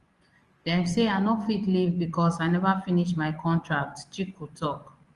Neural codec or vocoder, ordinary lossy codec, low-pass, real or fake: vocoder, 24 kHz, 100 mel bands, Vocos; Opus, 24 kbps; 10.8 kHz; fake